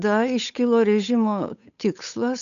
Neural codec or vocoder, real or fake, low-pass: codec, 16 kHz, 8 kbps, FunCodec, trained on Chinese and English, 25 frames a second; fake; 7.2 kHz